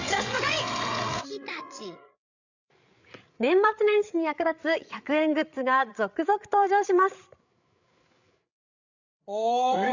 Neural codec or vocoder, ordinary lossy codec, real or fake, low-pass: codec, 16 kHz, 8 kbps, FreqCodec, larger model; none; fake; 7.2 kHz